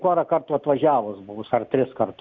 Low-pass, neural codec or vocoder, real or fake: 7.2 kHz; none; real